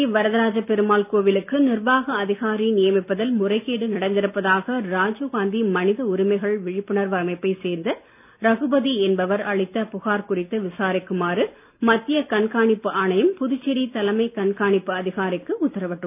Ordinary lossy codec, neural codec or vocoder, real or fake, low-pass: none; none; real; 3.6 kHz